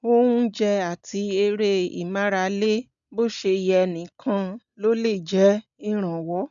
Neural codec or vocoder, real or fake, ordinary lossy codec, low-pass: none; real; none; 7.2 kHz